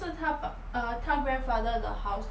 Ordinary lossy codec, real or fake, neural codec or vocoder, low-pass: none; real; none; none